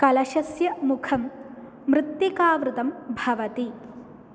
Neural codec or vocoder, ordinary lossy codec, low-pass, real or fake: none; none; none; real